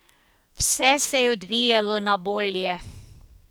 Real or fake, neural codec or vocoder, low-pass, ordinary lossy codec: fake; codec, 44.1 kHz, 2.6 kbps, SNAC; none; none